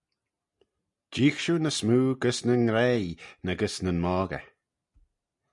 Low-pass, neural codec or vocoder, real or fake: 10.8 kHz; none; real